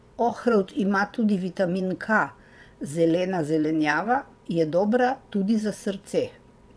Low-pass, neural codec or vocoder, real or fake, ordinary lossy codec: none; vocoder, 22.05 kHz, 80 mel bands, WaveNeXt; fake; none